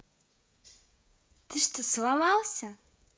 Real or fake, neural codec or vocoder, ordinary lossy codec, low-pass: fake; codec, 16 kHz, 16 kbps, FreqCodec, smaller model; none; none